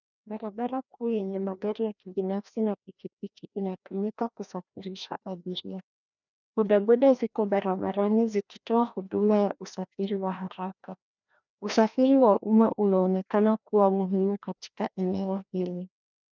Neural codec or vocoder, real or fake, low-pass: codec, 16 kHz, 1 kbps, FreqCodec, larger model; fake; 7.2 kHz